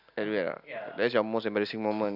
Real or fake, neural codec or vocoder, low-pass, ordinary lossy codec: real; none; 5.4 kHz; none